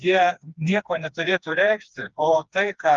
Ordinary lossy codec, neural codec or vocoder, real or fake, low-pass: Opus, 24 kbps; codec, 16 kHz, 2 kbps, FreqCodec, smaller model; fake; 7.2 kHz